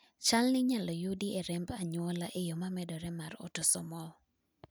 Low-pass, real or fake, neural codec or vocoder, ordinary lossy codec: none; real; none; none